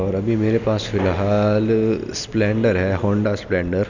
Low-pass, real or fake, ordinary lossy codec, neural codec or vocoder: 7.2 kHz; real; none; none